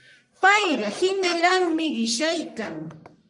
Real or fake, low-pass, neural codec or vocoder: fake; 10.8 kHz; codec, 44.1 kHz, 1.7 kbps, Pupu-Codec